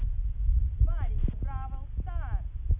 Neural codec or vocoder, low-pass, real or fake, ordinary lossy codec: none; 3.6 kHz; real; none